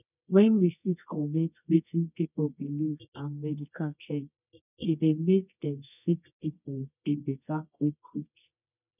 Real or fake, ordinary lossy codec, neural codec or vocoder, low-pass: fake; none; codec, 24 kHz, 0.9 kbps, WavTokenizer, medium music audio release; 3.6 kHz